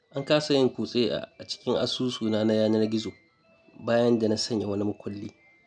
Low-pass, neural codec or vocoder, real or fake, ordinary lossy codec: 9.9 kHz; none; real; none